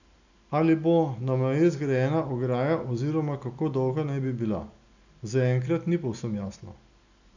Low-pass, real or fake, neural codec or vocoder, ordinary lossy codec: 7.2 kHz; real; none; none